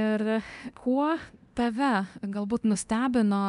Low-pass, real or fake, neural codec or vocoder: 10.8 kHz; fake; codec, 24 kHz, 0.9 kbps, DualCodec